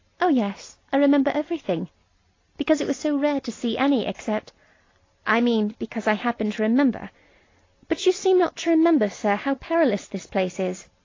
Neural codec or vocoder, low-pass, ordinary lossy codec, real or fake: none; 7.2 kHz; AAC, 32 kbps; real